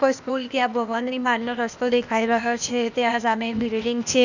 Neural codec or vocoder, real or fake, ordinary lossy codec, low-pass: codec, 16 kHz, 0.8 kbps, ZipCodec; fake; none; 7.2 kHz